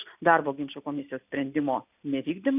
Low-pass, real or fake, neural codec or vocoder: 3.6 kHz; real; none